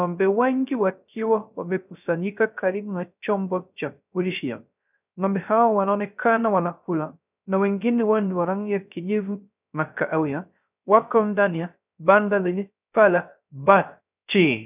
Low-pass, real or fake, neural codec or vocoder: 3.6 kHz; fake; codec, 16 kHz, 0.3 kbps, FocalCodec